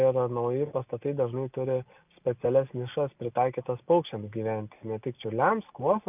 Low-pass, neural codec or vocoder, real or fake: 3.6 kHz; none; real